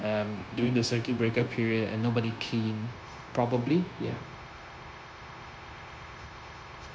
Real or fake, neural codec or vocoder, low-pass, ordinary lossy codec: fake; codec, 16 kHz, 0.9 kbps, LongCat-Audio-Codec; none; none